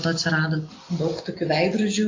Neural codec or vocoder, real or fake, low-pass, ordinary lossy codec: none; real; 7.2 kHz; AAC, 32 kbps